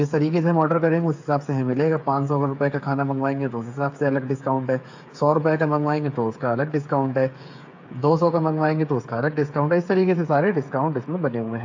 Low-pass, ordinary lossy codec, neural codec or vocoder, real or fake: 7.2 kHz; AAC, 48 kbps; codec, 16 kHz, 8 kbps, FreqCodec, smaller model; fake